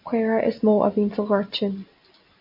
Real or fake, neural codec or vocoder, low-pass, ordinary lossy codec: real; none; 5.4 kHz; MP3, 48 kbps